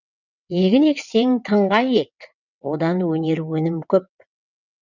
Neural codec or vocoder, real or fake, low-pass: vocoder, 22.05 kHz, 80 mel bands, WaveNeXt; fake; 7.2 kHz